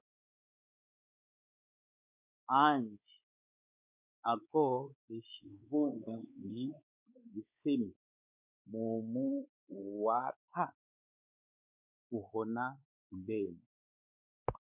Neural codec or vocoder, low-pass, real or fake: codec, 16 kHz, 4 kbps, X-Codec, WavLM features, trained on Multilingual LibriSpeech; 3.6 kHz; fake